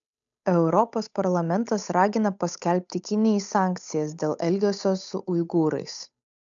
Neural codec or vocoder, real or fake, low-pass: codec, 16 kHz, 8 kbps, FunCodec, trained on Chinese and English, 25 frames a second; fake; 7.2 kHz